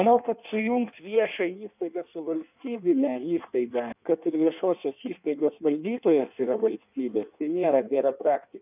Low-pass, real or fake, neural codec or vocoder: 3.6 kHz; fake; codec, 16 kHz in and 24 kHz out, 1.1 kbps, FireRedTTS-2 codec